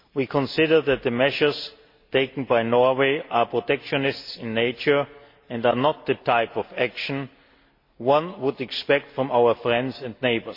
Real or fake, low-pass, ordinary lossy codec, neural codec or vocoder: real; 5.4 kHz; MP3, 32 kbps; none